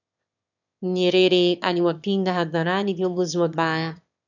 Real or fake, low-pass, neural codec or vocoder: fake; 7.2 kHz; autoencoder, 22.05 kHz, a latent of 192 numbers a frame, VITS, trained on one speaker